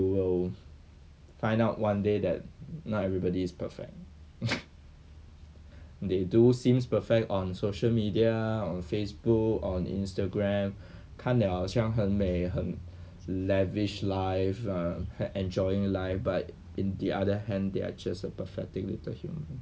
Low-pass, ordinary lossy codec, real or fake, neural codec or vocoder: none; none; real; none